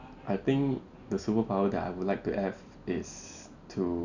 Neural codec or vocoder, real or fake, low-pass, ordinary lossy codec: none; real; 7.2 kHz; none